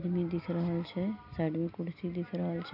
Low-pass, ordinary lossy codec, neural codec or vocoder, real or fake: 5.4 kHz; none; none; real